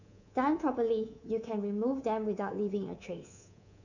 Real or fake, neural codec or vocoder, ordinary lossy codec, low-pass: fake; codec, 24 kHz, 3.1 kbps, DualCodec; none; 7.2 kHz